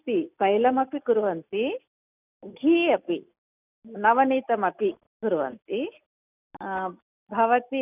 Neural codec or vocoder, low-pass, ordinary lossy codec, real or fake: none; 3.6 kHz; none; real